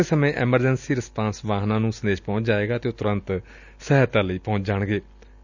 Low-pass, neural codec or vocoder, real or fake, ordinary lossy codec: 7.2 kHz; none; real; none